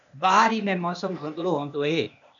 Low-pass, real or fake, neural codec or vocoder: 7.2 kHz; fake; codec, 16 kHz, 0.8 kbps, ZipCodec